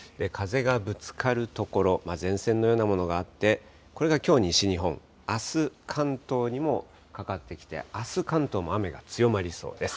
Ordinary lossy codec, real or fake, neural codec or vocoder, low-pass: none; real; none; none